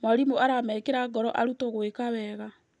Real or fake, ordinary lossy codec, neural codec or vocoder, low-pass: real; none; none; 10.8 kHz